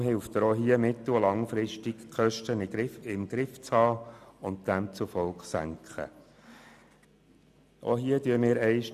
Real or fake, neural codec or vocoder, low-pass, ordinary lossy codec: real; none; 14.4 kHz; none